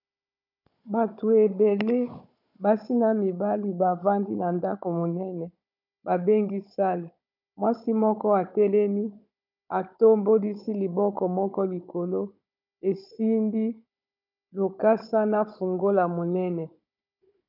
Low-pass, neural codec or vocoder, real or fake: 5.4 kHz; codec, 16 kHz, 16 kbps, FunCodec, trained on Chinese and English, 50 frames a second; fake